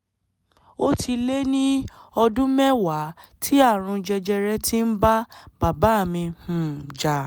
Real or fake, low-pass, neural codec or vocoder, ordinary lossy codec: real; none; none; none